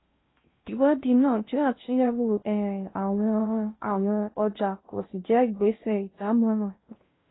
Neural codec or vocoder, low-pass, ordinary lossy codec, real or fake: codec, 16 kHz in and 24 kHz out, 0.6 kbps, FocalCodec, streaming, 2048 codes; 7.2 kHz; AAC, 16 kbps; fake